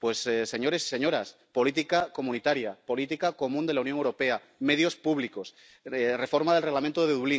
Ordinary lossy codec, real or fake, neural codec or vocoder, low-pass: none; real; none; none